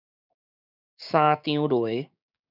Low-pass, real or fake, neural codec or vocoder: 5.4 kHz; fake; codec, 16 kHz, 6 kbps, DAC